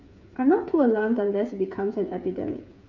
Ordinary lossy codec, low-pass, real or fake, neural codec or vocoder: none; 7.2 kHz; fake; codec, 16 kHz, 8 kbps, FreqCodec, smaller model